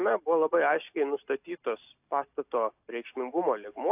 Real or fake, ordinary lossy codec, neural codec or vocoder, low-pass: real; AAC, 32 kbps; none; 3.6 kHz